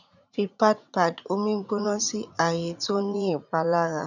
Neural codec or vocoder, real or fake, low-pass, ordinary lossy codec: vocoder, 44.1 kHz, 80 mel bands, Vocos; fake; 7.2 kHz; none